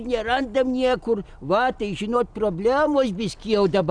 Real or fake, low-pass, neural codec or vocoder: real; 9.9 kHz; none